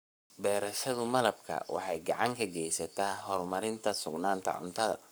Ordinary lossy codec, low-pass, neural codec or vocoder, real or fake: none; none; codec, 44.1 kHz, 7.8 kbps, Pupu-Codec; fake